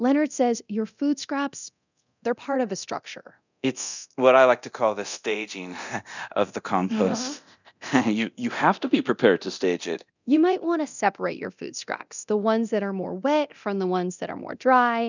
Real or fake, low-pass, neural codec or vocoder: fake; 7.2 kHz; codec, 24 kHz, 0.9 kbps, DualCodec